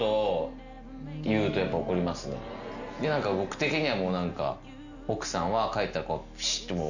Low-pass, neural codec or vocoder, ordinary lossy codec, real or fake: 7.2 kHz; none; none; real